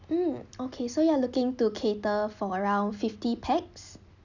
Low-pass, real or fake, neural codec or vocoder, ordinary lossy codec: 7.2 kHz; real; none; none